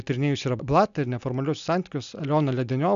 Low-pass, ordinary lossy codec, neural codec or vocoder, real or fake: 7.2 kHz; AAC, 96 kbps; none; real